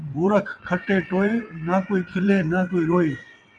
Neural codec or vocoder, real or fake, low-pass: vocoder, 22.05 kHz, 80 mel bands, WaveNeXt; fake; 9.9 kHz